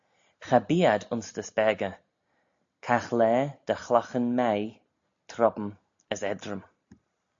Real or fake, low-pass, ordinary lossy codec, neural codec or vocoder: real; 7.2 kHz; AAC, 64 kbps; none